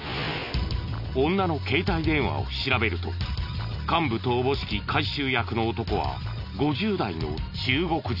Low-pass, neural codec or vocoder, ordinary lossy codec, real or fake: 5.4 kHz; none; none; real